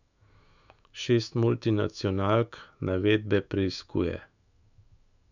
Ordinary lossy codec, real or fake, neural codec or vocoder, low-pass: none; fake; autoencoder, 48 kHz, 128 numbers a frame, DAC-VAE, trained on Japanese speech; 7.2 kHz